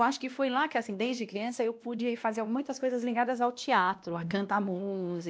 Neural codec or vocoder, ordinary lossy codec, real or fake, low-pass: codec, 16 kHz, 1 kbps, X-Codec, WavLM features, trained on Multilingual LibriSpeech; none; fake; none